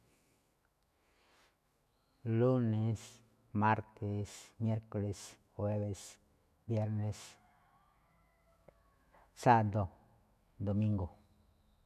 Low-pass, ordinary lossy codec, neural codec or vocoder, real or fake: 14.4 kHz; none; autoencoder, 48 kHz, 128 numbers a frame, DAC-VAE, trained on Japanese speech; fake